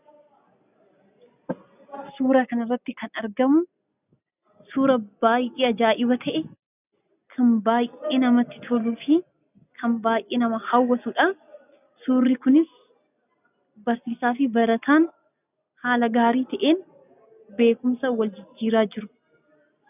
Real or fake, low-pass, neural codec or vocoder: real; 3.6 kHz; none